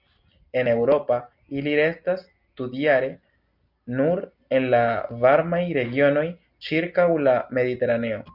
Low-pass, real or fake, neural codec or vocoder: 5.4 kHz; real; none